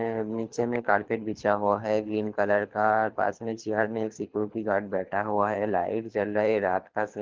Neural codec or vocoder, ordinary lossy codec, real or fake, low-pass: codec, 24 kHz, 6 kbps, HILCodec; Opus, 24 kbps; fake; 7.2 kHz